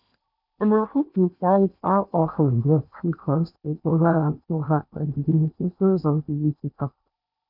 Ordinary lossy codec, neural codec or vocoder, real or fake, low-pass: none; codec, 16 kHz in and 24 kHz out, 0.8 kbps, FocalCodec, streaming, 65536 codes; fake; 5.4 kHz